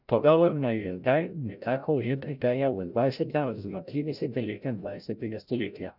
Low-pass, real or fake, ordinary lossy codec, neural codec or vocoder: 5.4 kHz; fake; none; codec, 16 kHz, 0.5 kbps, FreqCodec, larger model